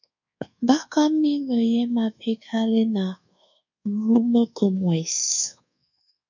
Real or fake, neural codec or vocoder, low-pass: fake; codec, 24 kHz, 1.2 kbps, DualCodec; 7.2 kHz